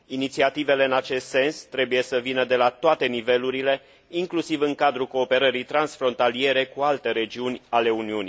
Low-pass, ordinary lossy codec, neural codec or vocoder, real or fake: none; none; none; real